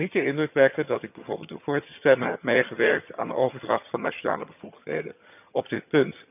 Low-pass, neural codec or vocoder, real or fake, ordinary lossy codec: 3.6 kHz; vocoder, 22.05 kHz, 80 mel bands, HiFi-GAN; fake; none